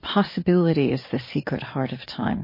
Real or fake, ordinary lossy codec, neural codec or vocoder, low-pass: fake; MP3, 24 kbps; vocoder, 44.1 kHz, 128 mel bands every 512 samples, BigVGAN v2; 5.4 kHz